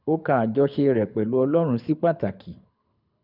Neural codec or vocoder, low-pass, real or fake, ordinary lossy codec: codec, 24 kHz, 6 kbps, HILCodec; 5.4 kHz; fake; none